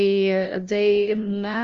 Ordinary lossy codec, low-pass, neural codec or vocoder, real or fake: Opus, 24 kbps; 7.2 kHz; codec, 16 kHz, 0.5 kbps, FunCodec, trained on LibriTTS, 25 frames a second; fake